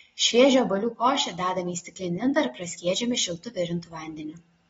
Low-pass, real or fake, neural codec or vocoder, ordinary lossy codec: 14.4 kHz; real; none; AAC, 24 kbps